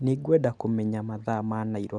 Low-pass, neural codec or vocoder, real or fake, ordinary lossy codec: 9.9 kHz; none; real; none